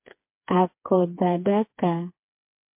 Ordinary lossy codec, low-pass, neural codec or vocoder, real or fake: MP3, 32 kbps; 3.6 kHz; codec, 16 kHz, 4 kbps, FreqCodec, smaller model; fake